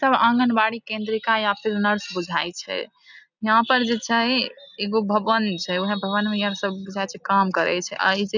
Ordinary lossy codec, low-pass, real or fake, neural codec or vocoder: none; 7.2 kHz; real; none